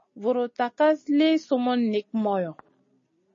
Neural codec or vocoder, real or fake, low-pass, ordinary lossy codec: none; real; 7.2 kHz; MP3, 32 kbps